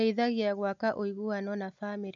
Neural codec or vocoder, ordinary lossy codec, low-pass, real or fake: none; MP3, 64 kbps; 7.2 kHz; real